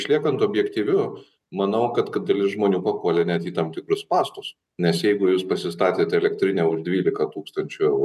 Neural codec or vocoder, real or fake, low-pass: none; real; 14.4 kHz